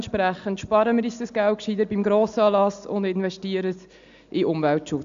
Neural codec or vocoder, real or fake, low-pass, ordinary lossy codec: none; real; 7.2 kHz; AAC, 64 kbps